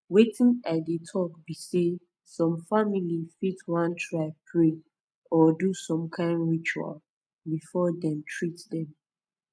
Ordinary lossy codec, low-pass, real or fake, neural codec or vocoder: none; none; real; none